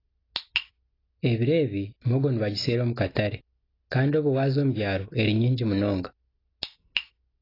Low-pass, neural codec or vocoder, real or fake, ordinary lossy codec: 5.4 kHz; none; real; AAC, 24 kbps